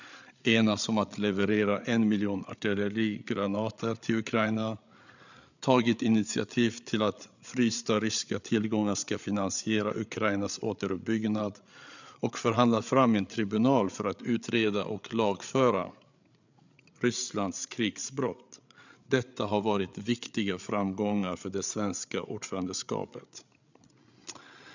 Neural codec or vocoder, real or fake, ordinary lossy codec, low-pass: codec, 16 kHz, 8 kbps, FreqCodec, larger model; fake; none; 7.2 kHz